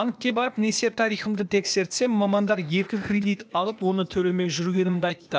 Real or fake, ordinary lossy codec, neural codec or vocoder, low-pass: fake; none; codec, 16 kHz, 0.8 kbps, ZipCodec; none